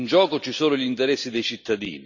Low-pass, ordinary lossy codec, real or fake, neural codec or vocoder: 7.2 kHz; none; real; none